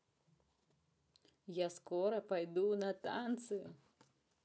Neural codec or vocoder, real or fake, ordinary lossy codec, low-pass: none; real; none; none